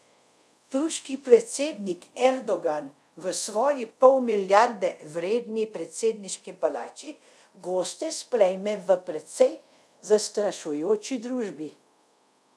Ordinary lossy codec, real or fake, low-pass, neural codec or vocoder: none; fake; none; codec, 24 kHz, 0.5 kbps, DualCodec